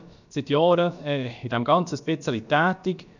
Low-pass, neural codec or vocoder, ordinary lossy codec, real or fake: 7.2 kHz; codec, 16 kHz, about 1 kbps, DyCAST, with the encoder's durations; none; fake